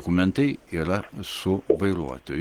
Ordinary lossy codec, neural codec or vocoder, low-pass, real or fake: Opus, 16 kbps; none; 19.8 kHz; real